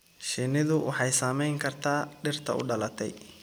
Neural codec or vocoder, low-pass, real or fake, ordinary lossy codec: none; none; real; none